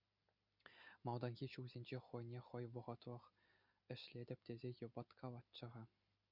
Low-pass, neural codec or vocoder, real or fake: 5.4 kHz; none; real